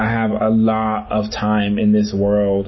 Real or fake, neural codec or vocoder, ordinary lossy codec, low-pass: real; none; MP3, 24 kbps; 7.2 kHz